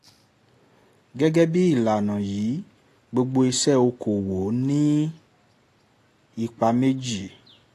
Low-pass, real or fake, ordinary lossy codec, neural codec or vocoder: 14.4 kHz; real; AAC, 48 kbps; none